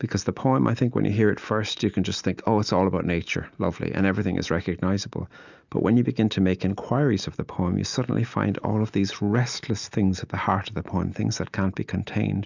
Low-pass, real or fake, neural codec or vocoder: 7.2 kHz; real; none